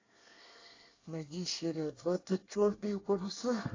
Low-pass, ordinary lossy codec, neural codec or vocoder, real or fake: 7.2 kHz; AAC, 32 kbps; codec, 24 kHz, 1 kbps, SNAC; fake